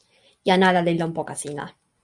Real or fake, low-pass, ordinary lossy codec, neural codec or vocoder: real; 10.8 kHz; Opus, 64 kbps; none